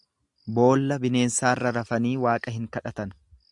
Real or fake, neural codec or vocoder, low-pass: real; none; 10.8 kHz